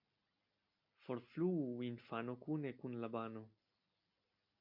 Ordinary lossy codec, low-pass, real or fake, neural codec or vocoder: MP3, 48 kbps; 5.4 kHz; real; none